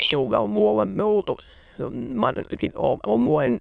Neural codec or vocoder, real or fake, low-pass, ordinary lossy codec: autoencoder, 22.05 kHz, a latent of 192 numbers a frame, VITS, trained on many speakers; fake; 9.9 kHz; MP3, 96 kbps